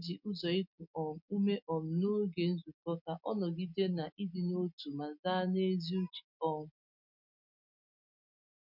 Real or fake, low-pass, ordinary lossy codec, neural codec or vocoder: real; 5.4 kHz; MP3, 48 kbps; none